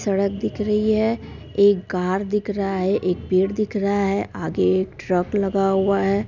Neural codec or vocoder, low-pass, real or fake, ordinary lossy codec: none; 7.2 kHz; real; none